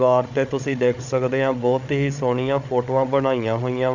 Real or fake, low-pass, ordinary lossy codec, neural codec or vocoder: fake; 7.2 kHz; none; codec, 16 kHz, 8 kbps, FreqCodec, larger model